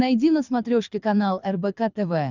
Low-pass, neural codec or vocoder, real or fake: 7.2 kHz; codec, 24 kHz, 6 kbps, HILCodec; fake